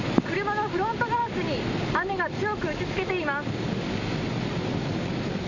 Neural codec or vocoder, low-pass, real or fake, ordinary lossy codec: none; 7.2 kHz; real; none